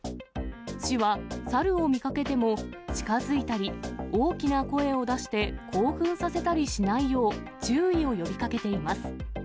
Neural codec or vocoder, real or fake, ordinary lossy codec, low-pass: none; real; none; none